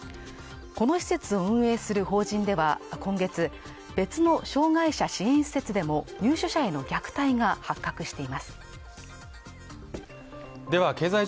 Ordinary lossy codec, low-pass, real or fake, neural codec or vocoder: none; none; real; none